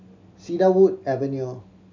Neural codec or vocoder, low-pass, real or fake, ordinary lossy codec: none; 7.2 kHz; real; MP3, 64 kbps